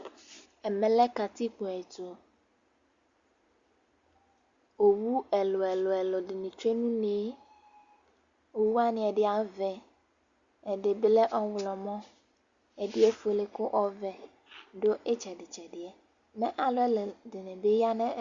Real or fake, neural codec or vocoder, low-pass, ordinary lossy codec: real; none; 7.2 kHz; Opus, 64 kbps